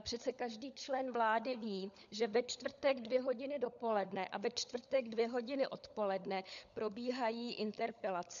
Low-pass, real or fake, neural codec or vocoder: 7.2 kHz; fake; codec, 16 kHz, 16 kbps, FunCodec, trained on LibriTTS, 50 frames a second